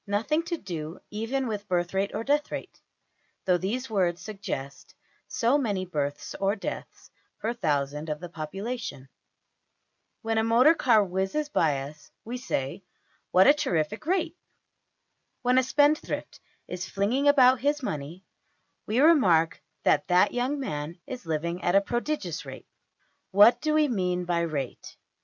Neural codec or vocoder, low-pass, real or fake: none; 7.2 kHz; real